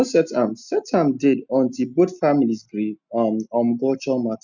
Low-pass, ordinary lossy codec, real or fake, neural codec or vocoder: 7.2 kHz; none; real; none